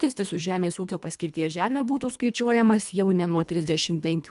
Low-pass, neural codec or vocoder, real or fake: 10.8 kHz; codec, 24 kHz, 1.5 kbps, HILCodec; fake